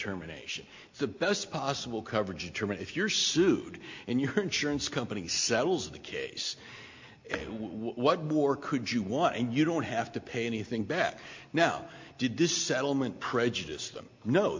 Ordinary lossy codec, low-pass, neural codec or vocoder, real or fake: MP3, 48 kbps; 7.2 kHz; none; real